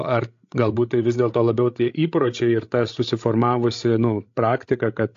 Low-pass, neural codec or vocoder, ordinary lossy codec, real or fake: 7.2 kHz; codec, 16 kHz, 16 kbps, FreqCodec, larger model; AAC, 48 kbps; fake